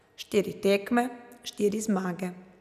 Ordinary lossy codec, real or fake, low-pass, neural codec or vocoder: none; real; 14.4 kHz; none